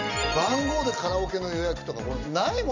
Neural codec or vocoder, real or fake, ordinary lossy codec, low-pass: none; real; none; 7.2 kHz